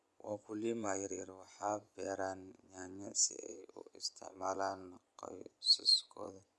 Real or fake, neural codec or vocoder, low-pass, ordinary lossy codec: fake; vocoder, 24 kHz, 100 mel bands, Vocos; none; none